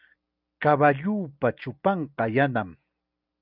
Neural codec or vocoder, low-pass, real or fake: none; 5.4 kHz; real